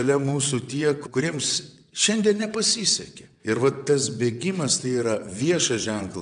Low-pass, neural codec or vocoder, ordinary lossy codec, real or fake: 9.9 kHz; vocoder, 22.05 kHz, 80 mel bands, WaveNeXt; AAC, 96 kbps; fake